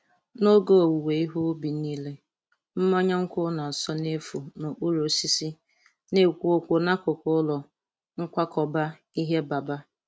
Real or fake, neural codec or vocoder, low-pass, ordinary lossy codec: real; none; none; none